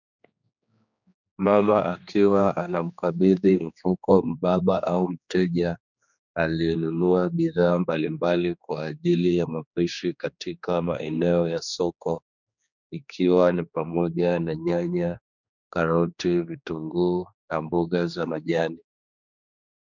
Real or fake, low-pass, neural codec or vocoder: fake; 7.2 kHz; codec, 16 kHz, 2 kbps, X-Codec, HuBERT features, trained on general audio